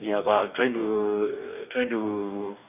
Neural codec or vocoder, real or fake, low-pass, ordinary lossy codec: codec, 44.1 kHz, 2.6 kbps, DAC; fake; 3.6 kHz; none